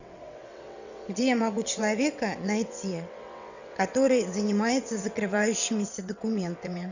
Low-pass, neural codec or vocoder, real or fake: 7.2 kHz; vocoder, 22.05 kHz, 80 mel bands, WaveNeXt; fake